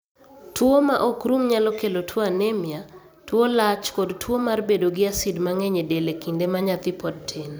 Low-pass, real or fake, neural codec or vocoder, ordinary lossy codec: none; fake; vocoder, 44.1 kHz, 128 mel bands every 256 samples, BigVGAN v2; none